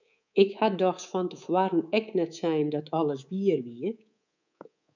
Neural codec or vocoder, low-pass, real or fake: codec, 24 kHz, 3.1 kbps, DualCodec; 7.2 kHz; fake